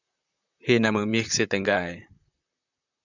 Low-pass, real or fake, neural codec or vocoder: 7.2 kHz; fake; vocoder, 44.1 kHz, 128 mel bands, Pupu-Vocoder